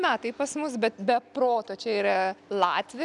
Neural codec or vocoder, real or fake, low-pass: none; real; 10.8 kHz